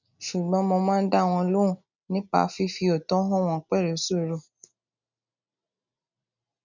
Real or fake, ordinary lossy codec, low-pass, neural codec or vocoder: real; none; 7.2 kHz; none